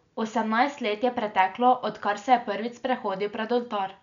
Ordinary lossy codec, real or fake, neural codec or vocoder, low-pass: none; real; none; 7.2 kHz